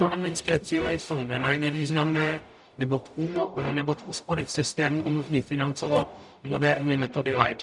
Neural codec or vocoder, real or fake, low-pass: codec, 44.1 kHz, 0.9 kbps, DAC; fake; 10.8 kHz